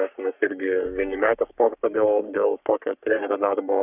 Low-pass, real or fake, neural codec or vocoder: 3.6 kHz; fake; codec, 44.1 kHz, 3.4 kbps, Pupu-Codec